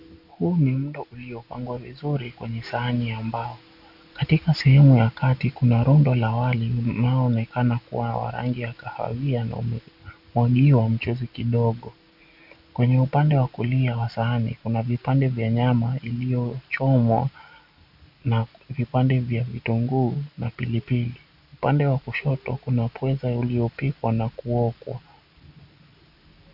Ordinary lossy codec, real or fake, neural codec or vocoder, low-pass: AAC, 48 kbps; real; none; 5.4 kHz